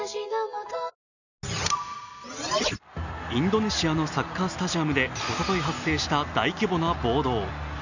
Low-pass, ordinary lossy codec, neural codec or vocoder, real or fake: 7.2 kHz; none; none; real